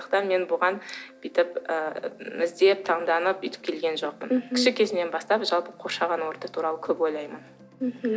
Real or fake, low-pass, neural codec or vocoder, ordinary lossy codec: real; none; none; none